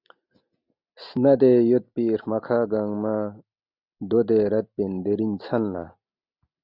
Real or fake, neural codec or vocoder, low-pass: real; none; 5.4 kHz